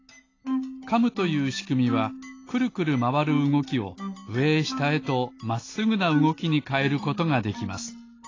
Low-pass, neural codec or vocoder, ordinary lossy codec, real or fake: 7.2 kHz; none; AAC, 32 kbps; real